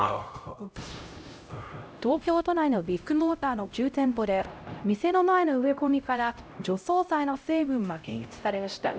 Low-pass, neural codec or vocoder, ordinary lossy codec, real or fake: none; codec, 16 kHz, 0.5 kbps, X-Codec, HuBERT features, trained on LibriSpeech; none; fake